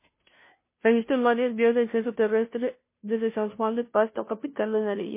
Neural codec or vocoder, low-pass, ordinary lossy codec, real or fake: codec, 16 kHz, 0.5 kbps, FunCodec, trained on Chinese and English, 25 frames a second; 3.6 kHz; MP3, 24 kbps; fake